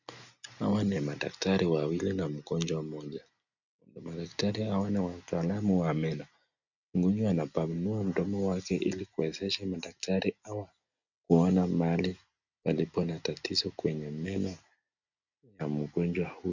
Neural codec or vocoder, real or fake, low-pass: none; real; 7.2 kHz